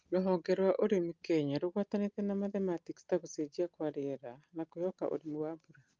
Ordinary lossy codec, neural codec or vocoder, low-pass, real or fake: Opus, 24 kbps; none; 7.2 kHz; real